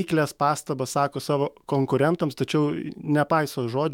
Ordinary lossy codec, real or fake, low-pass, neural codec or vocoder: MP3, 96 kbps; fake; 19.8 kHz; autoencoder, 48 kHz, 128 numbers a frame, DAC-VAE, trained on Japanese speech